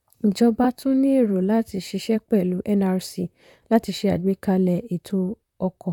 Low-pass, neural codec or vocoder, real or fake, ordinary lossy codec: 19.8 kHz; vocoder, 44.1 kHz, 128 mel bands, Pupu-Vocoder; fake; none